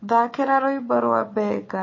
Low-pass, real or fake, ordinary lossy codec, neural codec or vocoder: 7.2 kHz; fake; MP3, 32 kbps; codec, 16 kHz, 4 kbps, FunCodec, trained on Chinese and English, 50 frames a second